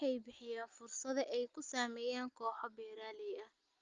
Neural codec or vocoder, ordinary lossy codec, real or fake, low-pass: none; Opus, 32 kbps; real; 7.2 kHz